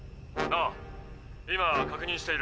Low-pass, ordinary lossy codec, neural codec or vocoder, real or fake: none; none; none; real